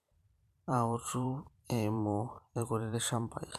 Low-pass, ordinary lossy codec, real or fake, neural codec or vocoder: 14.4 kHz; MP3, 96 kbps; fake; vocoder, 44.1 kHz, 128 mel bands every 512 samples, BigVGAN v2